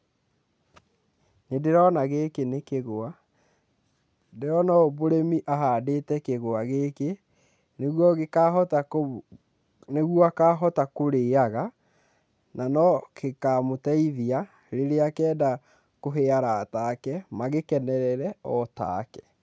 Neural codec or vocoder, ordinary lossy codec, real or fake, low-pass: none; none; real; none